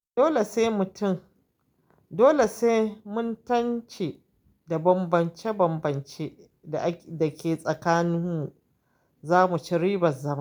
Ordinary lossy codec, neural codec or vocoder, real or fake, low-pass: none; none; real; none